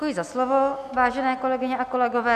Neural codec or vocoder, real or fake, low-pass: none; real; 14.4 kHz